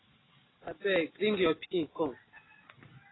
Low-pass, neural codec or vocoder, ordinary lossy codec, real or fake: 7.2 kHz; none; AAC, 16 kbps; real